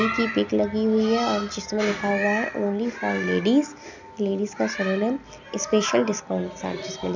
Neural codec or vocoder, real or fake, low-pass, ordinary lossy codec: none; real; 7.2 kHz; none